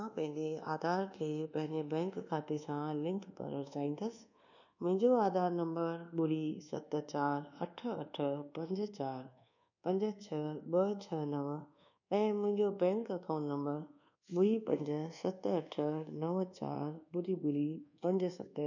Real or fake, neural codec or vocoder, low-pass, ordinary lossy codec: fake; codec, 24 kHz, 1.2 kbps, DualCodec; 7.2 kHz; none